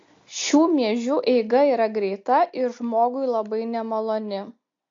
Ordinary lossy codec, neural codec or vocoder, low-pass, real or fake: AAC, 48 kbps; none; 7.2 kHz; real